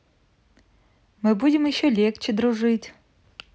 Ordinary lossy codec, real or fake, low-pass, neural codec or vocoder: none; real; none; none